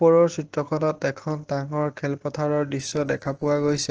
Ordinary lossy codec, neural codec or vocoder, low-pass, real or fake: Opus, 16 kbps; none; 7.2 kHz; real